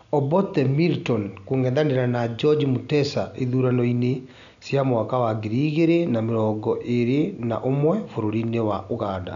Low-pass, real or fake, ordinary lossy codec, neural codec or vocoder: 7.2 kHz; real; none; none